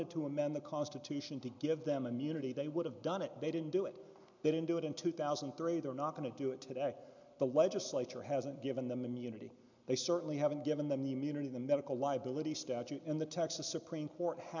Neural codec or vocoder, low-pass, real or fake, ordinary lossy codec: none; 7.2 kHz; real; MP3, 64 kbps